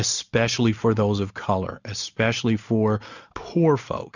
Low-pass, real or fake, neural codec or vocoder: 7.2 kHz; real; none